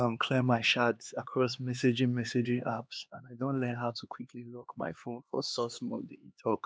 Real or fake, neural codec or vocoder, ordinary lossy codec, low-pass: fake; codec, 16 kHz, 2 kbps, X-Codec, HuBERT features, trained on LibriSpeech; none; none